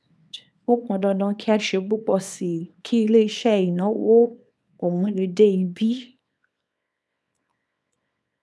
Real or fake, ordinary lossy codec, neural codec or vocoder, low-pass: fake; none; codec, 24 kHz, 0.9 kbps, WavTokenizer, small release; none